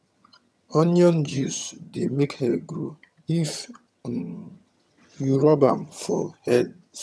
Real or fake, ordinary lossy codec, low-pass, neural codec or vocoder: fake; none; none; vocoder, 22.05 kHz, 80 mel bands, HiFi-GAN